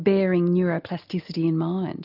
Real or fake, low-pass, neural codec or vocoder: real; 5.4 kHz; none